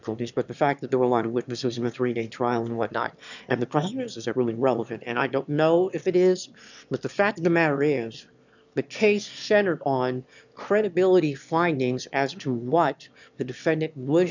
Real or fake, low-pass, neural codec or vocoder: fake; 7.2 kHz; autoencoder, 22.05 kHz, a latent of 192 numbers a frame, VITS, trained on one speaker